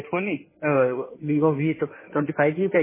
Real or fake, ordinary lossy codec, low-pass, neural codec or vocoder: fake; MP3, 16 kbps; 3.6 kHz; codec, 16 kHz in and 24 kHz out, 2.2 kbps, FireRedTTS-2 codec